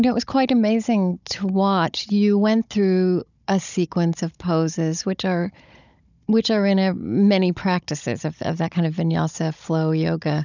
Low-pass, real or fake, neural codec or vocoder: 7.2 kHz; fake; codec, 16 kHz, 16 kbps, FunCodec, trained on Chinese and English, 50 frames a second